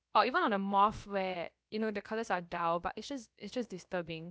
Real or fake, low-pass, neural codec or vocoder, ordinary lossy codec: fake; none; codec, 16 kHz, about 1 kbps, DyCAST, with the encoder's durations; none